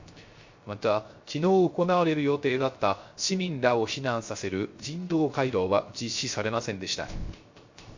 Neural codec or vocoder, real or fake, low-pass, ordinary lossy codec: codec, 16 kHz, 0.3 kbps, FocalCodec; fake; 7.2 kHz; MP3, 48 kbps